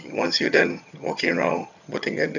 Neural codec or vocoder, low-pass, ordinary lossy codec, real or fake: vocoder, 22.05 kHz, 80 mel bands, HiFi-GAN; 7.2 kHz; none; fake